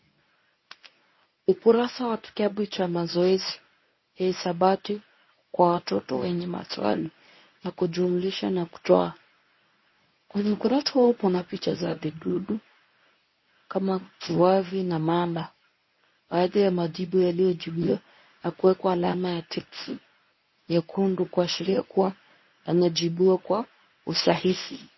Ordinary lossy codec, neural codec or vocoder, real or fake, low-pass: MP3, 24 kbps; codec, 24 kHz, 0.9 kbps, WavTokenizer, medium speech release version 1; fake; 7.2 kHz